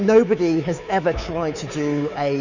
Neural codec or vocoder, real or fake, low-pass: codec, 24 kHz, 3.1 kbps, DualCodec; fake; 7.2 kHz